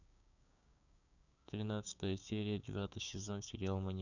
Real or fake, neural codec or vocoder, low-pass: fake; codec, 16 kHz, 6 kbps, DAC; 7.2 kHz